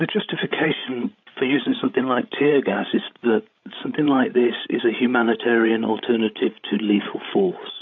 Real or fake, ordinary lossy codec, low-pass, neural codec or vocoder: fake; MP3, 48 kbps; 7.2 kHz; codec, 16 kHz, 16 kbps, FreqCodec, larger model